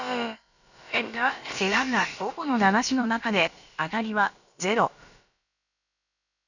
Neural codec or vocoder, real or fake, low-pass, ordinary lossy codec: codec, 16 kHz, about 1 kbps, DyCAST, with the encoder's durations; fake; 7.2 kHz; none